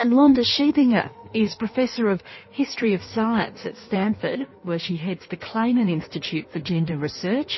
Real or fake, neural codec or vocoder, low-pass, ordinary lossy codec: fake; codec, 16 kHz in and 24 kHz out, 1.1 kbps, FireRedTTS-2 codec; 7.2 kHz; MP3, 24 kbps